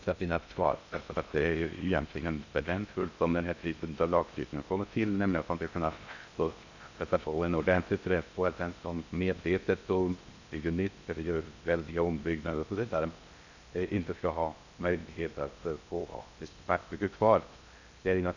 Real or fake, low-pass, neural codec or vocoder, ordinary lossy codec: fake; 7.2 kHz; codec, 16 kHz in and 24 kHz out, 0.6 kbps, FocalCodec, streaming, 2048 codes; none